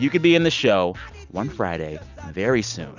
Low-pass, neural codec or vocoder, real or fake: 7.2 kHz; none; real